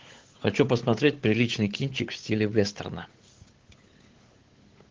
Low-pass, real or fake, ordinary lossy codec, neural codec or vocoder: 7.2 kHz; fake; Opus, 16 kbps; codec, 16 kHz, 8 kbps, FunCodec, trained on Chinese and English, 25 frames a second